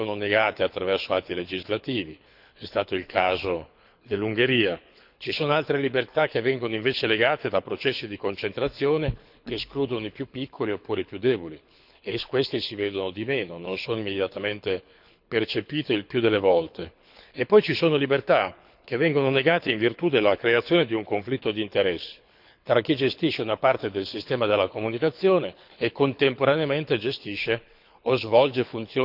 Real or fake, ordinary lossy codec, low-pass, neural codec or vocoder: fake; none; 5.4 kHz; codec, 24 kHz, 6 kbps, HILCodec